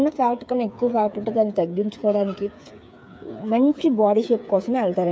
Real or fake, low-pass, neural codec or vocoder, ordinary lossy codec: fake; none; codec, 16 kHz, 8 kbps, FreqCodec, smaller model; none